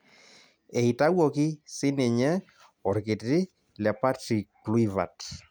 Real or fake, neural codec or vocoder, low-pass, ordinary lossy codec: real; none; none; none